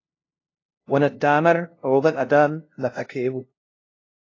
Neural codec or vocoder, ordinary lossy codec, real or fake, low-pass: codec, 16 kHz, 0.5 kbps, FunCodec, trained on LibriTTS, 25 frames a second; AAC, 32 kbps; fake; 7.2 kHz